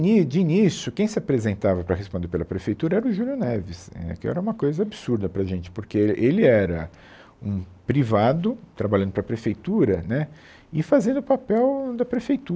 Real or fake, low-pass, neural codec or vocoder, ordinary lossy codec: real; none; none; none